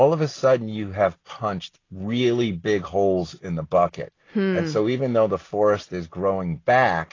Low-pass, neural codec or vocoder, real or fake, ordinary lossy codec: 7.2 kHz; none; real; AAC, 32 kbps